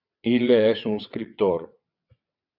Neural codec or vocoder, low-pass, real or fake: vocoder, 22.05 kHz, 80 mel bands, WaveNeXt; 5.4 kHz; fake